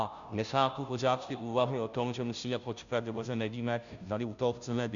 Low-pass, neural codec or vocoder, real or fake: 7.2 kHz; codec, 16 kHz, 0.5 kbps, FunCodec, trained on Chinese and English, 25 frames a second; fake